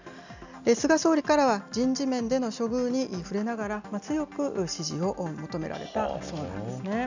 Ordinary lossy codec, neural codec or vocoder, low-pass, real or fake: none; none; 7.2 kHz; real